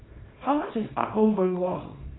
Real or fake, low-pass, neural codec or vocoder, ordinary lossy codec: fake; 7.2 kHz; codec, 24 kHz, 0.9 kbps, WavTokenizer, small release; AAC, 16 kbps